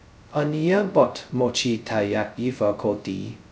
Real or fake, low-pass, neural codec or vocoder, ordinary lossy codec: fake; none; codec, 16 kHz, 0.2 kbps, FocalCodec; none